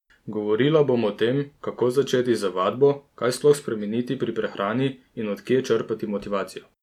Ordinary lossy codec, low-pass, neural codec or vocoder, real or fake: none; 19.8 kHz; none; real